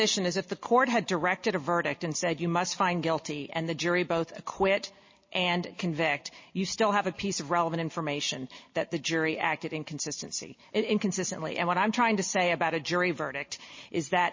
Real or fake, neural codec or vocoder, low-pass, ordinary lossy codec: real; none; 7.2 kHz; MP3, 32 kbps